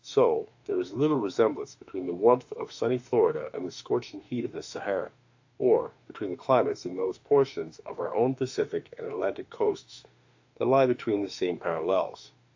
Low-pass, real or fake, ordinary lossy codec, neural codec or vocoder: 7.2 kHz; fake; AAC, 48 kbps; autoencoder, 48 kHz, 32 numbers a frame, DAC-VAE, trained on Japanese speech